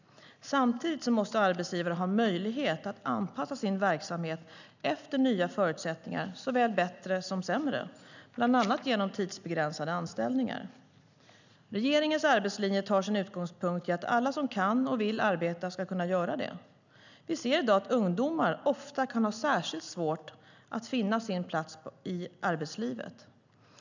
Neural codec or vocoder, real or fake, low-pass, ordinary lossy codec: none; real; 7.2 kHz; none